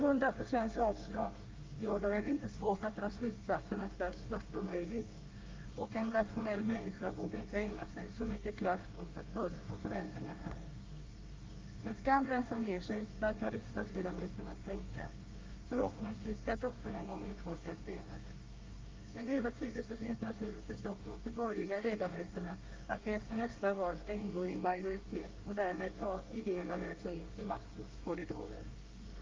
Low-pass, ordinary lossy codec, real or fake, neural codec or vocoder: 7.2 kHz; Opus, 24 kbps; fake; codec, 24 kHz, 1 kbps, SNAC